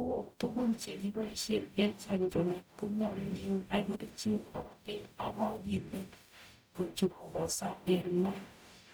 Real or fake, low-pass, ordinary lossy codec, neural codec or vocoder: fake; none; none; codec, 44.1 kHz, 0.9 kbps, DAC